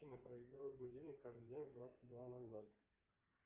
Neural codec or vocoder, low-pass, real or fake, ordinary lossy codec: codec, 16 kHz in and 24 kHz out, 2.2 kbps, FireRedTTS-2 codec; 3.6 kHz; fake; Opus, 32 kbps